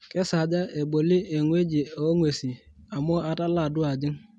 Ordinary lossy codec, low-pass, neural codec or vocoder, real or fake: none; none; none; real